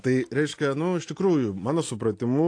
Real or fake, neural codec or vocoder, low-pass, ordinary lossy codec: real; none; 9.9 kHz; AAC, 48 kbps